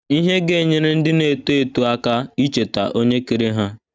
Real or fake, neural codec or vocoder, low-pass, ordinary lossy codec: real; none; none; none